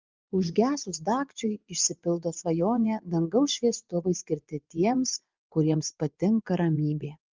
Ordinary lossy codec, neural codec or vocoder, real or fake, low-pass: Opus, 24 kbps; vocoder, 24 kHz, 100 mel bands, Vocos; fake; 7.2 kHz